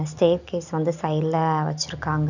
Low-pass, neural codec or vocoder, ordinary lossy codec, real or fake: 7.2 kHz; none; none; real